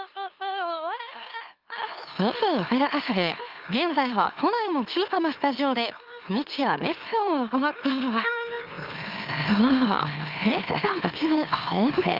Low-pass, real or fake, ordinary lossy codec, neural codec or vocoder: 5.4 kHz; fake; Opus, 24 kbps; autoencoder, 44.1 kHz, a latent of 192 numbers a frame, MeloTTS